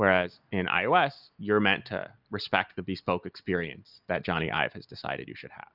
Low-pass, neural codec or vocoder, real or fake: 5.4 kHz; none; real